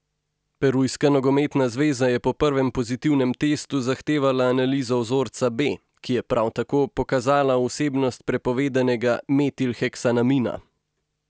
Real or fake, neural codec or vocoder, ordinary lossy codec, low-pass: real; none; none; none